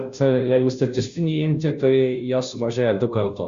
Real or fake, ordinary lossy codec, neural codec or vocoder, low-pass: fake; Opus, 64 kbps; codec, 16 kHz, 0.5 kbps, FunCodec, trained on Chinese and English, 25 frames a second; 7.2 kHz